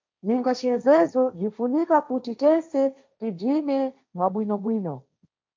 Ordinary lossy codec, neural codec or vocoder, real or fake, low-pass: MP3, 64 kbps; codec, 16 kHz, 1.1 kbps, Voila-Tokenizer; fake; 7.2 kHz